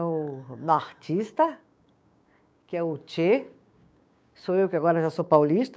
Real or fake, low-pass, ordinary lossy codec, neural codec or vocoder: fake; none; none; codec, 16 kHz, 6 kbps, DAC